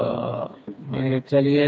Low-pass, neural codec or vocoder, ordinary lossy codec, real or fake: none; codec, 16 kHz, 2 kbps, FreqCodec, smaller model; none; fake